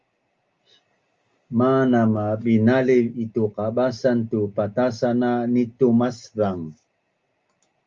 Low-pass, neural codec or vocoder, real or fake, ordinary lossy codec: 7.2 kHz; none; real; Opus, 32 kbps